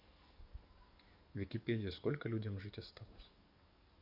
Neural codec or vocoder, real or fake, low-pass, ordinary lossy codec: codec, 44.1 kHz, 7.8 kbps, DAC; fake; 5.4 kHz; none